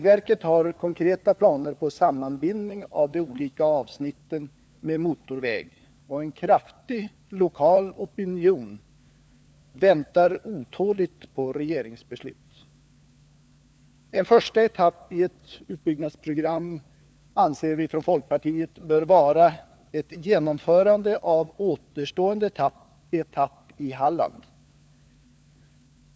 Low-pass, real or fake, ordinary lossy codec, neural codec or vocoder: none; fake; none; codec, 16 kHz, 4 kbps, FunCodec, trained on LibriTTS, 50 frames a second